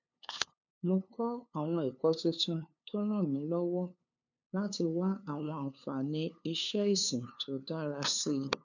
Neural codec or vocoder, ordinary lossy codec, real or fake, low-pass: codec, 16 kHz, 2 kbps, FunCodec, trained on LibriTTS, 25 frames a second; none; fake; 7.2 kHz